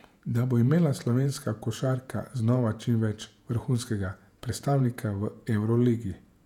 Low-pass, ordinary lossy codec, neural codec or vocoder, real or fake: 19.8 kHz; none; vocoder, 48 kHz, 128 mel bands, Vocos; fake